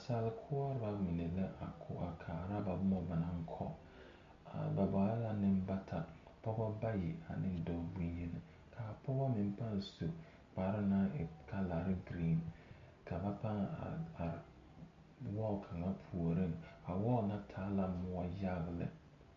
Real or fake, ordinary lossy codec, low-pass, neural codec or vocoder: real; MP3, 48 kbps; 7.2 kHz; none